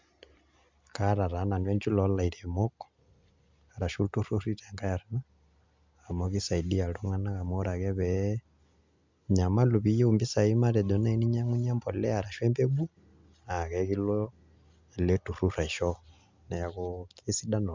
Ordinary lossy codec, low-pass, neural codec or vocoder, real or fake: MP3, 64 kbps; 7.2 kHz; none; real